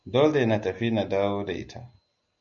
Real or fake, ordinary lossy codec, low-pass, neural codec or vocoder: real; MP3, 96 kbps; 7.2 kHz; none